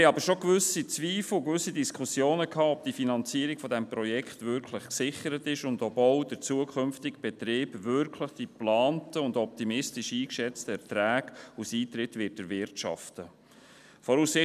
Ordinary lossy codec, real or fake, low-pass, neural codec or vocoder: none; real; 14.4 kHz; none